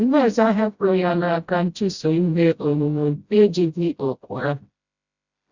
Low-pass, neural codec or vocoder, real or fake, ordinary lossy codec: 7.2 kHz; codec, 16 kHz, 0.5 kbps, FreqCodec, smaller model; fake; Opus, 64 kbps